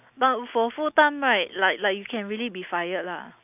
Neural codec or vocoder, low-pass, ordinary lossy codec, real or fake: none; 3.6 kHz; none; real